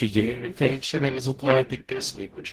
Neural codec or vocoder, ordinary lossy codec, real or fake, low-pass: codec, 44.1 kHz, 0.9 kbps, DAC; Opus, 16 kbps; fake; 14.4 kHz